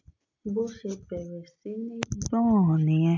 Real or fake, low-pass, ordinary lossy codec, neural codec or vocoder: real; 7.2 kHz; MP3, 64 kbps; none